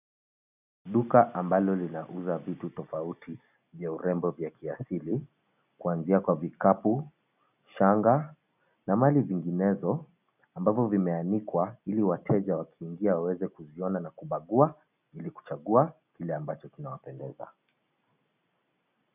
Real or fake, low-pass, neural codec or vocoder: real; 3.6 kHz; none